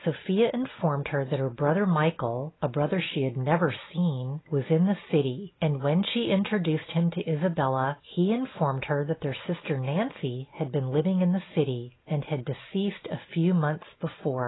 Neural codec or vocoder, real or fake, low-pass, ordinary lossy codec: none; real; 7.2 kHz; AAC, 16 kbps